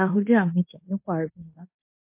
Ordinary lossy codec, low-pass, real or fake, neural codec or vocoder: MP3, 32 kbps; 3.6 kHz; fake; codec, 16 kHz in and 24 kHz out, 0.9 kbps, LongCat-Audio-Codec, fine tuned four codebook decoder